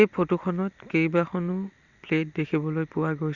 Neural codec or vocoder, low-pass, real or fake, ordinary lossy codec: none; 7.2 kHz; real; Opus, 64 kbps